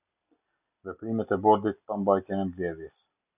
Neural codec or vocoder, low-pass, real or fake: none; 3.6 kHz; real